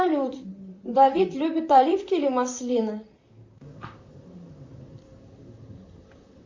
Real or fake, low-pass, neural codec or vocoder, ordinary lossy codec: fake; 7.2 kHz; vocoder, 44.1 kHz, 128 mel bands, Pupu-Vocoder; MP3, 64 kbps